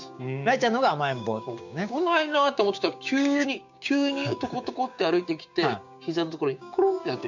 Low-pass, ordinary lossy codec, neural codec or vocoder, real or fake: 7.2 kHz; none; codec, 16 kHz, 6 kbps, DAC; fake